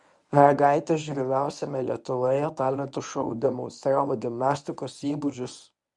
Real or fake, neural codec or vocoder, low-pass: fake; codec, 24 kHz, 0.9 kbps, WavTokenizer, medium speech release version 1; 10.8 kHz